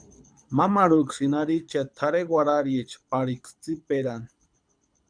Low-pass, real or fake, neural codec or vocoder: 9.9 kHz; fake; codec, 24 kHz, 6 kbps, HILCodec